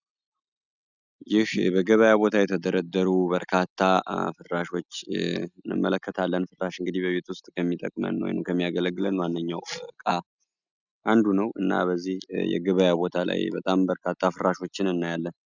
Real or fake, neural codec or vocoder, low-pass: real; none; 7.2 kHz